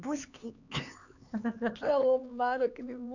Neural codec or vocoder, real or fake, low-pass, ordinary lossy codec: codec, 16 kHz, 4 kbps, X-Codec, HuBERT features, trained on LibriSpeech; fake; 7.2 kHz; none